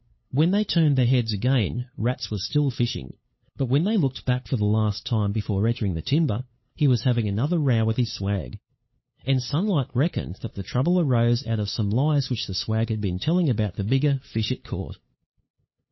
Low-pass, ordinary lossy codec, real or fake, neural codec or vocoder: 7.2 kHz; MP3, 24 kbps; fake; codec, 16 kHz, 8 kbps, FunCodec, trained on LibriTTS, 25 frames a second